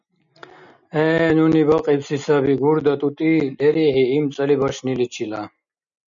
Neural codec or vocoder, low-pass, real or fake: none; 7.2 kHz; real